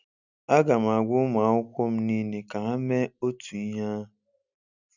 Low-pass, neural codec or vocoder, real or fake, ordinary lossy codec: 7.2 kHz; none; real; none